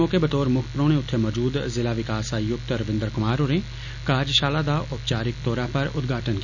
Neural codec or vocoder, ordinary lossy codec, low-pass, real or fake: none; none; 7.2 kHz; real